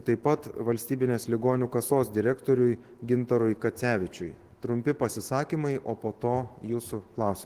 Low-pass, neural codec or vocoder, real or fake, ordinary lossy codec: 14.4 kHz; autoencoder, 48 kHz, 128 numbers a frame, DAC-VAE, trained on Japanese speech; fake; Opus, 16 kbps